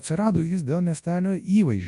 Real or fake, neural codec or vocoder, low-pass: fake; codec, 24 kHz, 0.9 kbps, WavTokenizer, large speech release; 10.8 kHz